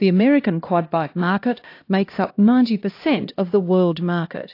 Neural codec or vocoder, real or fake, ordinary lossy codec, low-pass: codec, 16 kHz, 1 kbps, X-Codec, HuBERT features, trained on LibriSpeech; fake; AAC, 32 kbps; 5.4 kHz